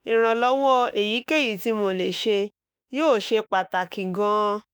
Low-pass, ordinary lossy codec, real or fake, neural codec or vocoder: none; none; fake; autoencoder, 48 kHz, 32 numbers a frame, DAC-VAE, trained on Japanese speech